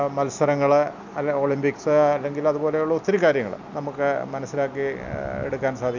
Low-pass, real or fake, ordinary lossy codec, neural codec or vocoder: 7.2 kHz; real; none; none